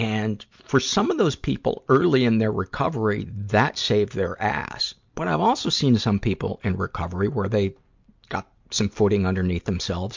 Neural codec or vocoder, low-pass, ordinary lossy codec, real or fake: none; 7.2 kHz; MP3, 64 kbps; real